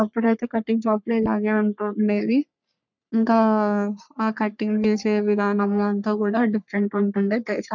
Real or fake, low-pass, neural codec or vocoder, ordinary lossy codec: fake; 7.2 kHz; codec, 44.1 kHz, 3.4 kbps, Pupu-Codec; none